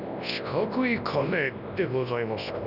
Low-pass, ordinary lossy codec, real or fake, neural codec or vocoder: 5.4 kHz; none; fake; codec, 24 kHz, 0.9 kbps, WavTokenizer, large speech release